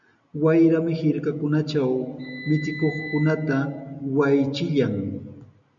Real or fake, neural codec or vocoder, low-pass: real; none; 7.2 kHz